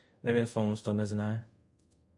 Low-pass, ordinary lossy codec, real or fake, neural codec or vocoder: 10.8 kHz; MP3, 64 kbps; fake; codec, 24 kHz, 0.5 kbps, DualCodec